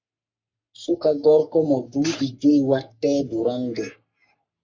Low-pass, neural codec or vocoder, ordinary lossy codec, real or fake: 7.2 kHz; codec, 44.1 kHz, 3.4 kbps, Pupu-Codec; MP3, 64 kbps; fake